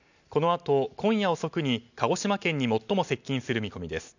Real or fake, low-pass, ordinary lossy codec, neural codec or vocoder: real; 7.2 kHz; none; none